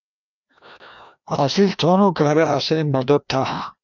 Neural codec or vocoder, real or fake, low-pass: codec, 16 kHz, 1 kbps, FreqCodec, larger model; fake; 7.2 kHz